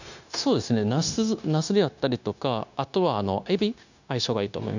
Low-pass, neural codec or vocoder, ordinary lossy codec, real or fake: 7.2 kHz; codec, 16 kHz, 0.9 kbps, LongCat-Audio-Codec; none; fake